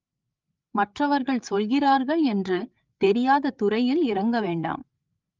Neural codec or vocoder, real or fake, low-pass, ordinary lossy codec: codec, 16 kHz, 8 kbps, FreqCodec, larger model; fake; 7.2 kHz; Opus, 32 kbps